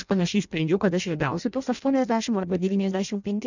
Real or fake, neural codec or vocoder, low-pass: fake; codec, 16 kHz in and 24 kHz out, 0.6 kbps, FireRedTTS-2 codec; 7.2 kHz